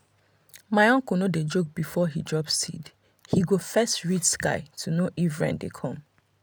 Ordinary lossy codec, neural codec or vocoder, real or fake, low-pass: none; none; real; none